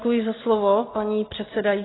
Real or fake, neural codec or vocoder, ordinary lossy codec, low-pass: real; none; AAC, 16 kbps; 7.2 kHz